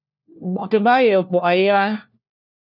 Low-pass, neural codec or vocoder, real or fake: 5.4 kHz; codec, 16 kHz, 1 kbps, FunCodec, trained on LibriTTS, 50 frames a second; fake